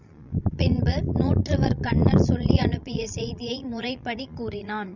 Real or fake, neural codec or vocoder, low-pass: fake; vocoder, 22.05 kHz, 80 mel bands, Vocos; 7.2 kHz